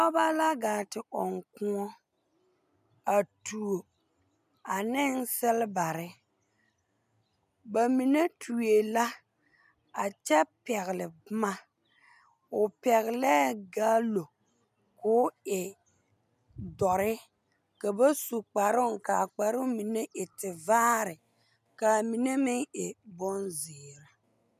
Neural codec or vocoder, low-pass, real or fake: none; 14.4 kHz; real